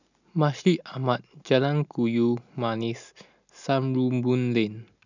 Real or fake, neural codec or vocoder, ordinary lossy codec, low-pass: real; none; none; 7.2 kHz